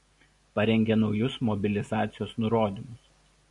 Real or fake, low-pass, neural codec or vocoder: real; 10.8 kHz; none